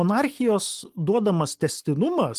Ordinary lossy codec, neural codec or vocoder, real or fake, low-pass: Opus, 24 kbps; none; real; 14.4 kHz